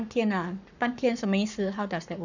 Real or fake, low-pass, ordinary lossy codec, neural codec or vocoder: fake; 7.2 kHz; none; codec, 44.1 kHz, 7.8 kbps, Pupu-Codec